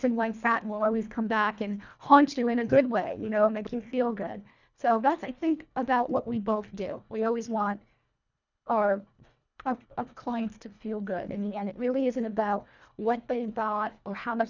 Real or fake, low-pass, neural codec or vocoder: fake; 7.2 kHz; codec, 24 kHz, 1.5 kbps, HILCodec